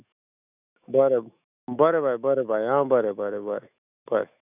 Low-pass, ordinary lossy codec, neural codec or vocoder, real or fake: 3.6 kHz; none; autoencoder, 48 kHz, 128 numbers a frame, DAC-VAE, trained on Japanese speech; fake